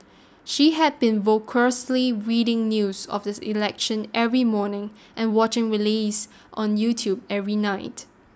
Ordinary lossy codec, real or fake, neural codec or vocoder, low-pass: none; real; none; none